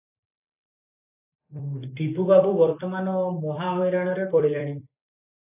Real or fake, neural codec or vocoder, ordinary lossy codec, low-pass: fake; vocoder, 44.1 kHz, 128 mel bands every 256 samples, BigVGAN v2; AAC, 32 kbps; 3.6 kHz